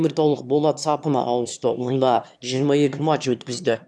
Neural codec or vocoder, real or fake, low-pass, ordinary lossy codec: autoencoder, 22.05 kHz, a latent of 192 numbers a frame, VITS, trained on one speaker; fake; none; none